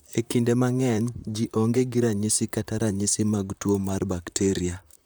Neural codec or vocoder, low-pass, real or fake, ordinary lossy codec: vocoder, 44.1 kHz, 128 mel bands, Pupu-Vocoder; none; fake; none